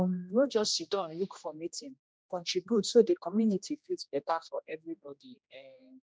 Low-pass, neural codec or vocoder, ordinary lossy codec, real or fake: none; codec, 16 kHz, 1 kbps, X-Codec, HuBERT features, trained on general audio; none; fake